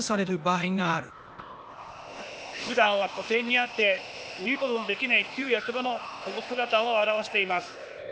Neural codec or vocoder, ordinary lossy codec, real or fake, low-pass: codec, 16 kHz, 0.8 kbps, ZipCodec; none; fake; none